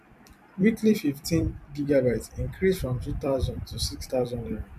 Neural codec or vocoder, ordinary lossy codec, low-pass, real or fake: vocoder, 44.1 kHz, 128 mel bands every 256 samples, BigVGAN v2; none; 14.4 kHz; fake